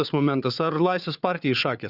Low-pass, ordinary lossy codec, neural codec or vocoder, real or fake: 5.4 kHz; Opus, 64 kbps; codec, 16 kHz, 4 kbps, FunCodec, trained on Chinese and English, 50 frames a second; fake